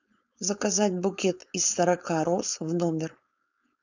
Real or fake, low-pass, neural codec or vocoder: fake; 7.2 kHz; codec, 16 kHz, 4.8 kbps, FACodec